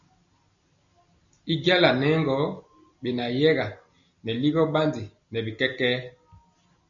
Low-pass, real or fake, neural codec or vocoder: 7.2 kHz; real; none